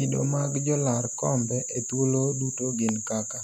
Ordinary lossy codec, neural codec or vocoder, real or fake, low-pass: none; none; real; 19.8 kHz